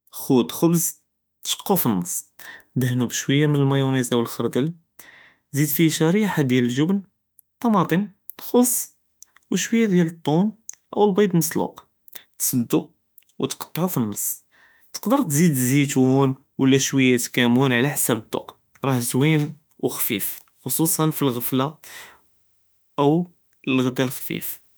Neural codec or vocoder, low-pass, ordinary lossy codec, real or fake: autoencoder, 48 kHz, 32 numbers a frame, DAC-VAE, trained on Japanese speech; none; none; fake